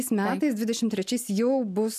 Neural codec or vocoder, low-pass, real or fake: none; 14.4 kHz; real